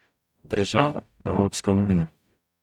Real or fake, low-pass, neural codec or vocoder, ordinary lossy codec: fake; 19.8 kHz; codec, 44.1 kHz, 0.9 kbps, DAC; none